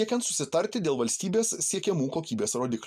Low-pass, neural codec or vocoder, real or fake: 14.4 kHz; none; real